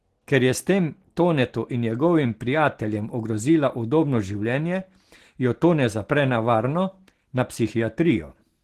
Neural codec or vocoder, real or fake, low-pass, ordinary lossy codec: none; real; 14.4 kHz; Opus, 16 kbps